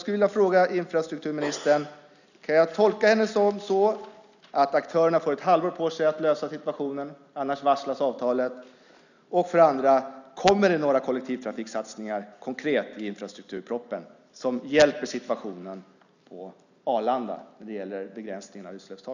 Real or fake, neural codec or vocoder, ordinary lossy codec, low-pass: real; none; none; 7.2 kHz